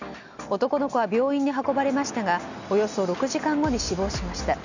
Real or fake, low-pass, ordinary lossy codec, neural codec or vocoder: real; 7.2 kHz; none; none